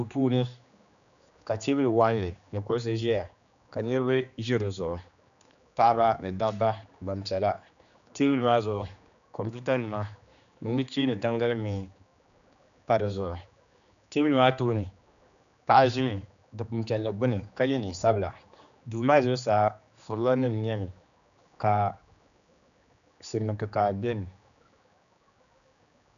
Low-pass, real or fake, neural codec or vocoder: 7.2 kHz; fake; codec, 16 kHz, 2 kbps, X-Codec, HuBERT features, trained on general audio